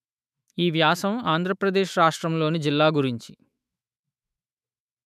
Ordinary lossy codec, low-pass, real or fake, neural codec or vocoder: none; 14.4 kHz; fake; autoencoder, 48 kHz, 128 numbers a frame, DAC-VAE, trained on Japanese speech